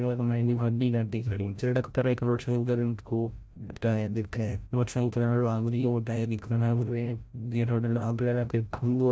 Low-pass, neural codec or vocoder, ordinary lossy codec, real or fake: none; codec, 16 kHz, 0.5 kbps, FreqCodec, larger model; none; fake